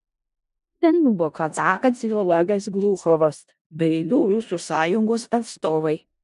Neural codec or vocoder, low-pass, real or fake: codec, 16 kHz in and 24 kHz out, 0.4 kbps, LongCat-Audio-Codec, four codebook decoder; 10.8 kHz; fake